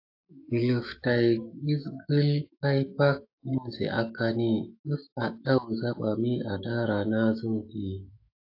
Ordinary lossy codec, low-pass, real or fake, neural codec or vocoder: AAC, 32 kbps; 5.4 kHz; fake; autoencoder, 48 kHz, 128 numbers a frame, DAC-VAE, trained on Japanese speech